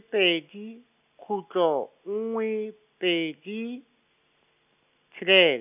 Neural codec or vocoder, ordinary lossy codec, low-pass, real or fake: none; none; 3.6 kHz; real